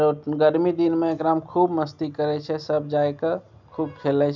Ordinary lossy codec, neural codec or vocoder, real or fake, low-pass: none; none; real; 7.2 kHz